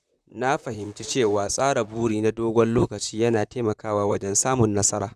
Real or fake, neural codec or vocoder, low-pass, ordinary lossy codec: fake; vocoder, 44.1 kHz, 128 mel bands, Pupu-Vocoder; 14.4 kHz; none